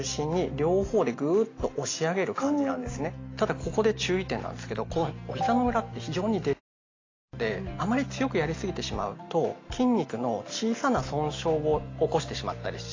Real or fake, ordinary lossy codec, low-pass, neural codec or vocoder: real; AAC, 32 kbps; 7.2 kHz; none